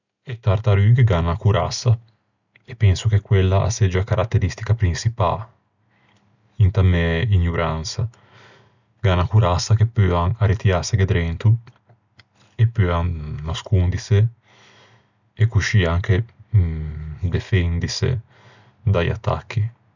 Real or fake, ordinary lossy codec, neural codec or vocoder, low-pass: real; none; none; 7.2 kHz